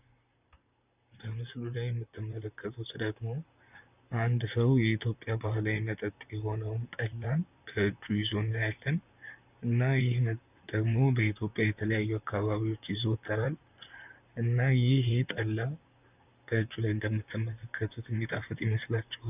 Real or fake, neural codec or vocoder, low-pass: fake; vocoder, 44.1 kHz, 128 mel bands, Pupu-Vocoder; 3.6 kHz